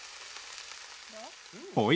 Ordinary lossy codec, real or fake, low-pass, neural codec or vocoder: none; real; none; none